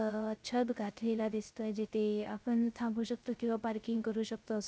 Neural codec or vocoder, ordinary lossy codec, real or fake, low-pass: codec, 16 kHz, 0.3 kbps, FocalCodec; none; fake; none